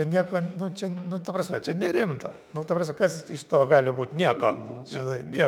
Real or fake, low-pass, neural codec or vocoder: fake; 19.8 kHz; autoencoder, 48 kHz, 32 numbers a frame, DAC-VAE, trained on Japanese speech